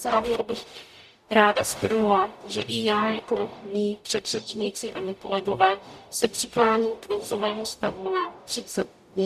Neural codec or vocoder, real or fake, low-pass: codec, 44.1 kHz, 0.9 kbps, DAC; fake; 14.4 kHz